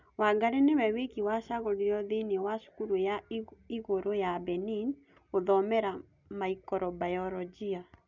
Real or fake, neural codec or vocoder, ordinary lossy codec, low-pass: real; none; none; 7.2 kHz